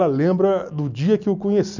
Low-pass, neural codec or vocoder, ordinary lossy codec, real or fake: 7.2 kHz; none; none; real